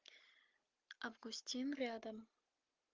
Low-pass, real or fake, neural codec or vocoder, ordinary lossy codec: 7.2 kHz; real; none; Opus, 32 kbps